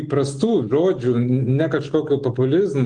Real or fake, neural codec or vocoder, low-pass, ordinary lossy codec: real; none; 9.9 kHz; Opus, 24 kbps